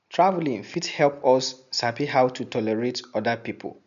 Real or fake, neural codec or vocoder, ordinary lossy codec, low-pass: real; none; none; 7.2 kHz